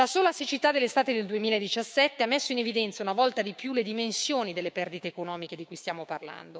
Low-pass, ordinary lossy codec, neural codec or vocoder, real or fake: none; none; codec, 16 kHz, 6 kbps, DAC; fake